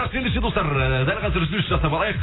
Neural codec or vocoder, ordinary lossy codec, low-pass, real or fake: none; AAC, 16 kbps; 7.2 kHz; real